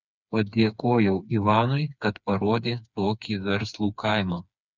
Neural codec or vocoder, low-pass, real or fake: codec, 16 kHz, 4 kbps, FreqCodec, smaller model; 7.2 kHz; fake